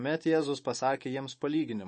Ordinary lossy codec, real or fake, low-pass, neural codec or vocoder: MP3, 32 kbps; fake; 10.8 kHz; autoencoder, 48 kHz, 128 numbers a frame, DAC-VAE, trained on Japanese speech